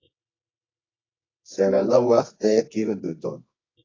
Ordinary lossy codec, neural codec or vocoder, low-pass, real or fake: AAC, 32 kbps; codec, 24 kHz, 0.9 kbps, WavTokenizer, medium music audio release; 7.2 kHz; fake